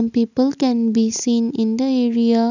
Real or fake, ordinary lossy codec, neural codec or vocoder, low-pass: real; none; none; 7.2 kHz